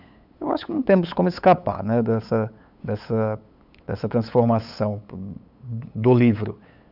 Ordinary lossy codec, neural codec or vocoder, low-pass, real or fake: none; codec, 16 kHz, 8 kbps, FunCodec, trained on LibriTTS, 25 frames a second; 5.4 kHz; fake